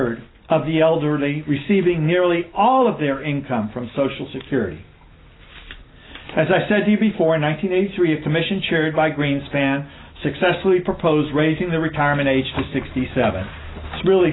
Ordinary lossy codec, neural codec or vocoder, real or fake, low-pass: AAC, 16 kbps; none; real; 7.2 kHz